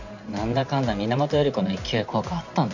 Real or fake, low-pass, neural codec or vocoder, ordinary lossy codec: fake; 7.2 kHz; vocoder, 44.1 kHz, 128 mel bands every 512 samples, BigVGAN v2; none